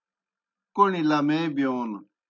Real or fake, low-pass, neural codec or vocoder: real; 7.2 kHz; none